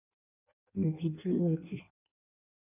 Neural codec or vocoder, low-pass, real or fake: codec, 16 kHz in and 24 kHz out, 0.6 kbps, FireRedTTS-2 codec; 3.6 kHz; fake